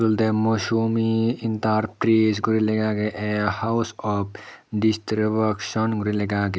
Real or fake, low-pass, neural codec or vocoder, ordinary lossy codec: real; none; none; none